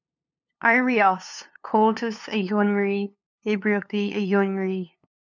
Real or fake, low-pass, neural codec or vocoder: fake; 7.2 kHz; codec, 16 kHz, 2 kbps, FunCodec, trained on LibriTTS, 25 frames a second